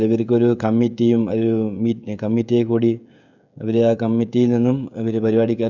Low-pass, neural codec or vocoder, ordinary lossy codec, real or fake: 7.2 kHz; codec, 16 kHz, 16 kbps, FreqCodec, smaller model; none; fake